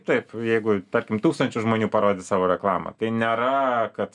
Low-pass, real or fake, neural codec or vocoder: 10.8 kHz; real; none